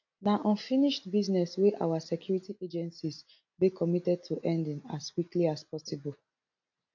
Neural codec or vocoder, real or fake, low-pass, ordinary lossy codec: none; real; 7.2 kHz; AAC, 48 kbps